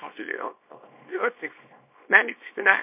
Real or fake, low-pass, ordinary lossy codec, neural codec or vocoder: fake; 3.6 kHz; MP3, 24 kbps; codec, 24 kHz, 0.9 kbps, WavTokenizer, small release